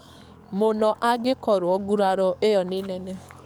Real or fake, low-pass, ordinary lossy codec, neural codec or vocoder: fake; none; none; codec, 44.1 kHz, 7.8 kbps, DAC